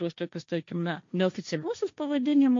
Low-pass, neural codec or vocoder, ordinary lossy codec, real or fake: 7.2 kHz; codec, 16 kHz, 1.1 kbps, Voila-Tokenizer; MP3, 48 kbps; fake